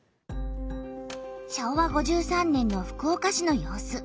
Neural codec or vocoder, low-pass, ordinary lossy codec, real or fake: none; none; none; real